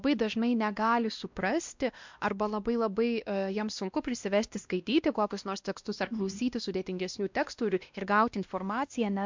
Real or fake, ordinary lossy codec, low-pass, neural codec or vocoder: fake; MP3, 64 kbps; 7.2 kHz; codec, 16 kHz, 1 kbps, X-Codec, WavLM features, trained on Multilingual LibriSpeech